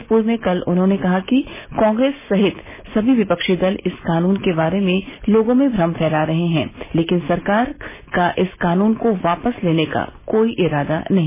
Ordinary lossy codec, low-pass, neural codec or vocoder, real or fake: MP3, 16 kbps; 3.6 kHz; none; real